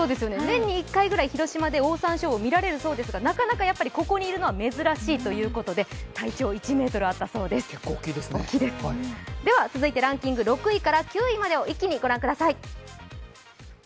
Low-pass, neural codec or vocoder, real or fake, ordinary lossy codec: none; none; real; none